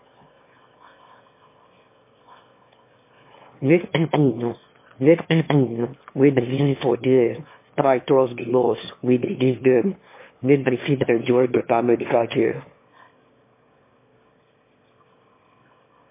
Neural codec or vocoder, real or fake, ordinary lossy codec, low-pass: autoencoder, 22.05 kHz, a latent of 192 numbers a frame, VITS, trained on one speaker; fake; MP3, 24 kbps; 3.6 kHz